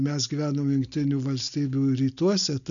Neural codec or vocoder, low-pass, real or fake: none; 7.2 kHz; real